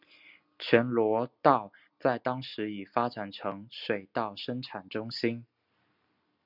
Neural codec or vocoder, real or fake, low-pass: none; real; 5.4 kHz